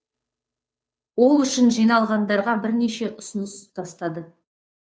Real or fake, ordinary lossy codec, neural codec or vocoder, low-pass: fake; none; codec, 16 kHz, 2 kbps, FunCodec, trained on Chinese and English, 25 frames a second; none